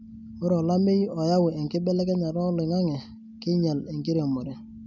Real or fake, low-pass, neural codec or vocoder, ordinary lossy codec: real; 7.2 kHz; none; none